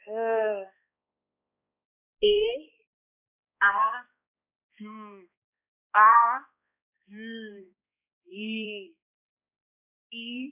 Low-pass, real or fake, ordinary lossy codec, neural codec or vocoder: 3.6 kHz; fake; none; codec, 16 kHz, 1 kbps, X-Codec, HuBERT features, trained on balanced general audio